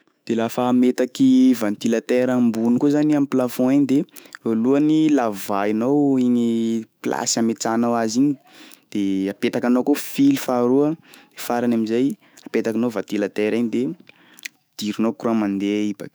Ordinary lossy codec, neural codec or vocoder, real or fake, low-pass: none; autoencoder, 48 kHz, 128 numbers a frame, DAC-VAE, trained on Japanese speech; fake; none